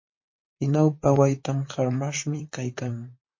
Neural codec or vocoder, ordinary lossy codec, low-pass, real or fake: vocoder, 44.1 kHz, 128 mel bands, Pupu-Vocoder; MP3, 32 kbps; 7.2 kHz; fake